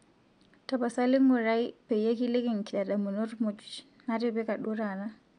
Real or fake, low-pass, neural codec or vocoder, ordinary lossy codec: real; 9.9 kHz; none; none